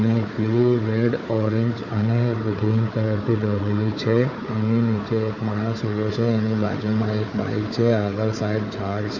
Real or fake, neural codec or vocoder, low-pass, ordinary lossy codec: fake; codec, 16 kHz, 4 kbps, FunCodec, trained on Chinese and English, 50 frames a second; 7.2 kHz; none